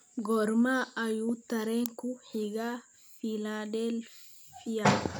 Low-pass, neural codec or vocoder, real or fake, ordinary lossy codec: none; none; real; none